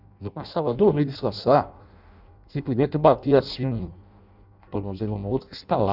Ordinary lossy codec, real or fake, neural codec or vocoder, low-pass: none; fake; codec, 16 kHz in and 24 kHz out, 0.6 kbps, FireRedTTS-2 codec; 5.4 kHz